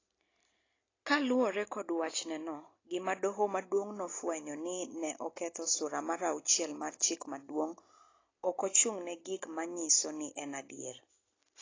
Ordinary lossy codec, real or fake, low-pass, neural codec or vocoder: AAC, 32 kbps; real; 7.2 kHz; none